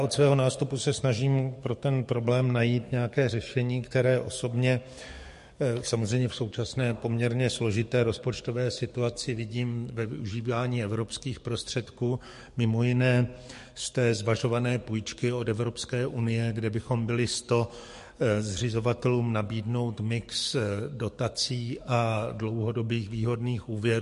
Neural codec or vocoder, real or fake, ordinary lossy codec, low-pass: codec, 44.1 kHz, 7.8 kbps, DAC; fake; MP3, 48 kbps; 14.4 kHz